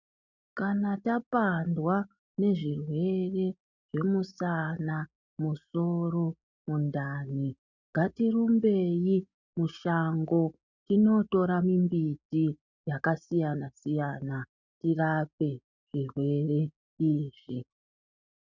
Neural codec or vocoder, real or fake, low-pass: none; real; 7.2 kHz